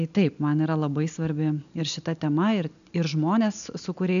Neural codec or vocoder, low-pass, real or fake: none; 7.2 kHz; real